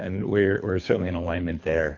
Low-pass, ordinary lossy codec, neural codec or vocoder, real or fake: 7.2 kHz; MP3, 48 kbps; codec, 24 kHz, 3 kbps, HILCodec; fake